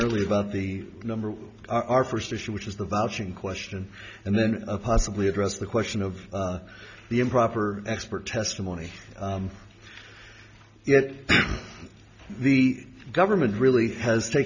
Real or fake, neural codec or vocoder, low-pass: real; none; 7.2 kHz